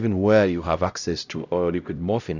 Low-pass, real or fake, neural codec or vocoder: 7.2 kHz; fake; codec, 16 kHz, 0.5 kbps, X-Codec, HuBERT features, trained on LibriSpeech